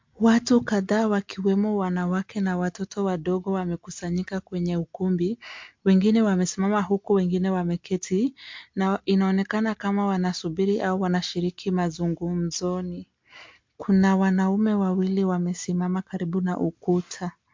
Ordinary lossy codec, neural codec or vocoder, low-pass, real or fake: MP3, 48 kbps; none; 7.2 kHz; real